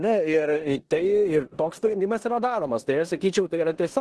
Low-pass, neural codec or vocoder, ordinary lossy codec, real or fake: 10.8 kHz; codec, 16 kHz in and 24 kHz out, 0.9 kbps, LongCat-Audio-Codec, four codebook decoder; Opus, 16 kbps; fake